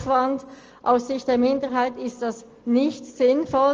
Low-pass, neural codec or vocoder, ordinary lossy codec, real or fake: 7.2 kHz; none; Opus, 24 kbps; real